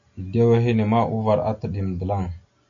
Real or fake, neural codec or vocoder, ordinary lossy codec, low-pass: real; none; AAC, 48 kbps; 7.2 kHz